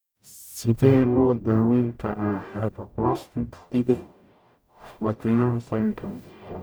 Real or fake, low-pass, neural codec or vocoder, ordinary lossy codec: fake; none; codec, 44.1 kHz, 0.9 kbps, DAC; none